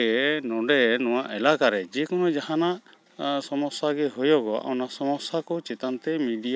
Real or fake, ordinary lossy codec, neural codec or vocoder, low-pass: real; none; none; none